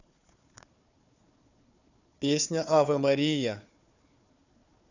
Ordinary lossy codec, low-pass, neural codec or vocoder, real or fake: none; 7.2 kHz; codec, 16 kHz, 4 kbps, FunCodec, trained on Chinese and English, 50 frames a second; fake